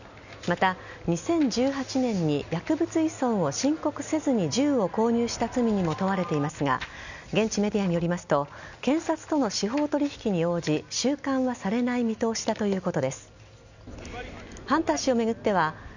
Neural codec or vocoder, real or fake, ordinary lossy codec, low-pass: none; real; none; 7.2 kHz